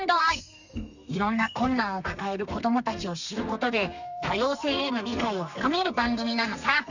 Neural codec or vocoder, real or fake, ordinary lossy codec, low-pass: codec, 32 kHz, 1.9 kbps, SNAC; fake; none; 7.2 kHz